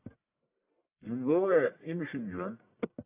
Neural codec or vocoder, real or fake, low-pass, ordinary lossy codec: codec, 44.1 kHz, 1.7 kbps, Pupu-Codec; fake; 3.6 kHz; AAC, 32 kbps